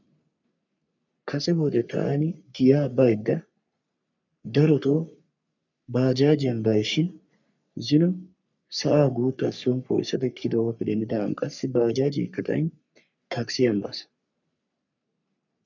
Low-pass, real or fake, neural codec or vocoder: 7.2 kHz; fake; codec, 44.1 kHz, 3.4 kbps, Pupu-Codec